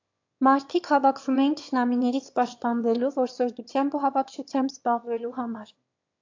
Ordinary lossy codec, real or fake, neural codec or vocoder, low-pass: AAC, 48 kbps; fake; autoencoder, 22.05 kHz, a latent of 192 numbers a frame, VITS, trained on one speaker; 7.2 kHz